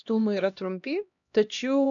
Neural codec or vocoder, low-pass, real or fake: codec, 16 kHz, 1 kbps, X-Codec, HuBERT features, trained on LibriSpeech; 7.2 kHz; fake